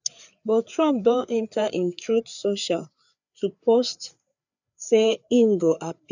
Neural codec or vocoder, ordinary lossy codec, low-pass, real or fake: codec, 16 kHz, 4 kbps, FreqCodec, larger model; none; 7.2 kHz; fake